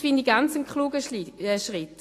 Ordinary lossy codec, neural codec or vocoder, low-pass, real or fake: AAC, 48 kbps; none; 14.4 kHz; real